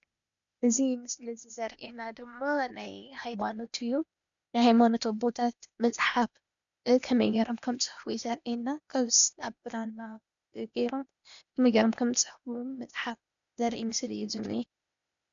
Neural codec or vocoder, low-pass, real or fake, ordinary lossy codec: codec, 16 kHz, 0.8 kbps, ZipCodec; 7.2 kHz; fake; MP3, 96 kbps